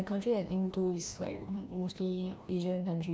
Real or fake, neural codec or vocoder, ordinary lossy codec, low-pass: fake; codec, 16 kHz, 1 kbps, FreqCodec, larger model; none; none